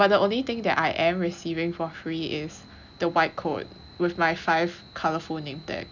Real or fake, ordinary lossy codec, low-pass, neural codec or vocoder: real; none; 7.2 kHz; none